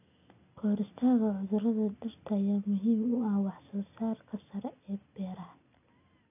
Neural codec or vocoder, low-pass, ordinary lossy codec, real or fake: none; 3.6 kHz; none; real